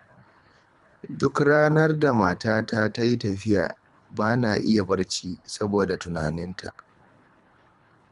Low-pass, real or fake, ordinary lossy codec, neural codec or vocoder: 10.8 kHz; fake; none; codec, 24 kHz, 3 kbps, HILCodec